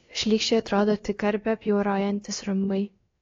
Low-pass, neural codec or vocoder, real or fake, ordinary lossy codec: 7.2 kHz; codec, 16 kHz, about 1 kbps, DyCAST, with the encoder's durations; fake; AAC, 32 kbps